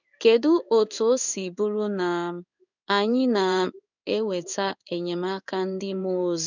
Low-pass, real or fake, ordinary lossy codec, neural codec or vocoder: 7.2 kHz; fake; none; codec, 16 kHz in and 24 kHz out, 1 kbps, XY-Tokenizer